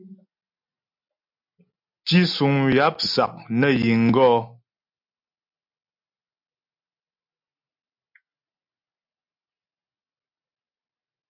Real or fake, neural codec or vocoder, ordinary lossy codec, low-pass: real; none; MP3, 48 kbps; 5.4 kHz